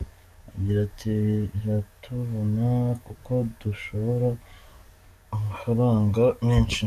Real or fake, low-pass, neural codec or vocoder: fake; 14.4 kHz; codec, 44.1 kHz, 7.8 kbps, DAC